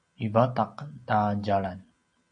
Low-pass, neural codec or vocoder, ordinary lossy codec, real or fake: 9.9 kHz; none; MP3, 48 kbps; real